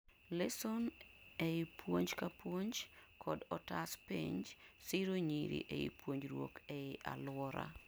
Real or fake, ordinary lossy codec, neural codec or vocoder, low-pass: real; none; none; none